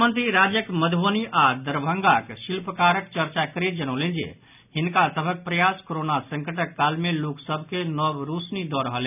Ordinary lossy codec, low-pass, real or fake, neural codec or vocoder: none; 3.6 kHz; real; none